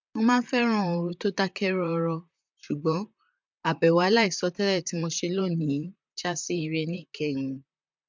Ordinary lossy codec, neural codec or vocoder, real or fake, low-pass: MP3, 64 kbps; vocoder, 44.1 kHz, 128 mel bands, Pupu-Vocoder; fake; 7.2 kHz